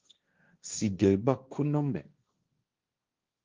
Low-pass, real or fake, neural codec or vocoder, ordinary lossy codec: 7.2 kHz; fake; codec, 16 kHz, 1.1 kbps, Voila-Tokenizer; Opus, 24 kbps